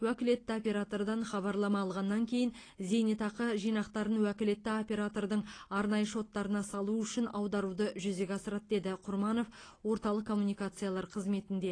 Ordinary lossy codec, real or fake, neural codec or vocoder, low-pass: AAC, 32 kbps; real; none; 9.9 kHz